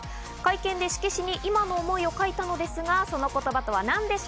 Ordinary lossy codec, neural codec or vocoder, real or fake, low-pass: none; none; real; none